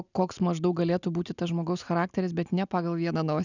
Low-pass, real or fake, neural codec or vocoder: 7.2 kHz; real; none